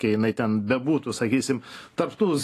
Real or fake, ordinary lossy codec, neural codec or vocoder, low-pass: real; AAC, 48 kbps; none; 14.4 kHz